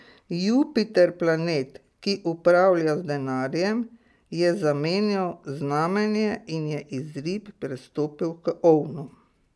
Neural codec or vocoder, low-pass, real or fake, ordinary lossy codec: none; none; real; none